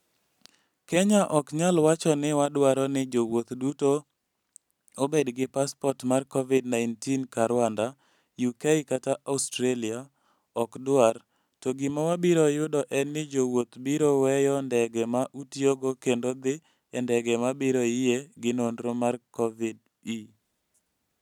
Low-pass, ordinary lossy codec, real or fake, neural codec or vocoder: 19.8 kHz; none; real; none